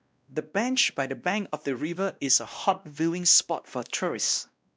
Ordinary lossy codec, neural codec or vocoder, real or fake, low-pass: none; codec, 16 kHz, 1 kbps, X-Codec, WavLM features, trained on Multilingual LibriSpeech; fake; none